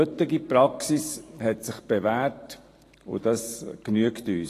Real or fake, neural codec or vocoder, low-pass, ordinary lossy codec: fake; vocoder, 44.1 kHz, 128 mel bands every 512 samples, BigVGAN v2; 14.4 kHz; AAC, 48 kbps